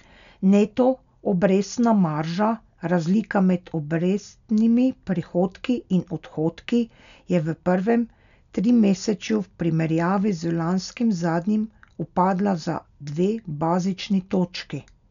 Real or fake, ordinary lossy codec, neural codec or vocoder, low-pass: real; none; none; 7.2 kHz